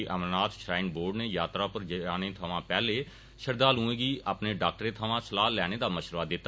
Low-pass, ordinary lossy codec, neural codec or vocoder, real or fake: none; none; none; real